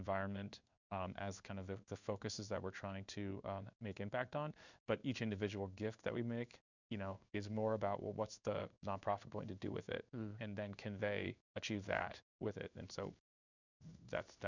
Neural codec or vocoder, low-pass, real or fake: codec, 16 kHz in and 24 kHz out, 1 kbps, XY-Tokenizer; 7.2 kHz; fake